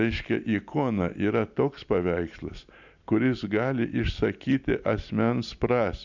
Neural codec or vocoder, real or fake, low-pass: none; real; 7.2 kHz